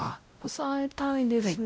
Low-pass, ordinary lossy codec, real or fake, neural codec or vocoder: none; none; fake; codec, 16 kHz, 0.5 kbps, X-Codec, WavLM features, trained on Multilingual LibriSpeech